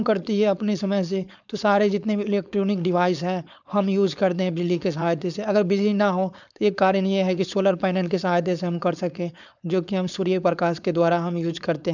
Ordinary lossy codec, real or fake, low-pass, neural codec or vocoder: none; fake; 7.2 kHz; codec, 16 kHz, 4.8 kbps, FACodec